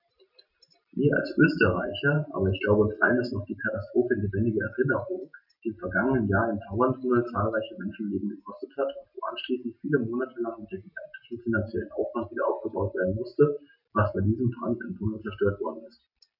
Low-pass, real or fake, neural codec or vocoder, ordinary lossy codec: 5.4 kHz; real; none; none